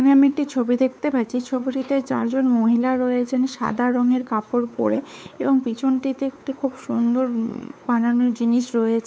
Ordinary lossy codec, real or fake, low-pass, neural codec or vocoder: none; fake; none; codec, 16 kHz, 4 kbps, X-Codec, WavLM features, trained on Multilingual LibriSpeech